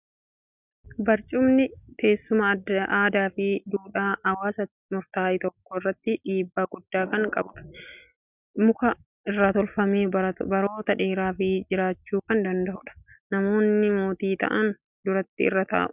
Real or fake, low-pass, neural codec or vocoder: real; 3.6 kHz; none